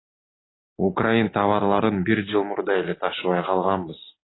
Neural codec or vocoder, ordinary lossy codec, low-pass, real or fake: none; AAC, 16 kbps; 7.2 kHz; real